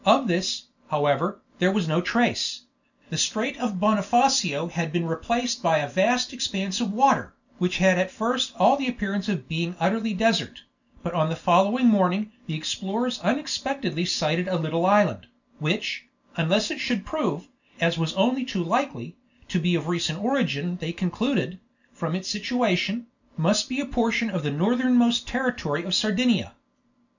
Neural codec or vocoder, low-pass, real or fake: none; 7.2 kHz; real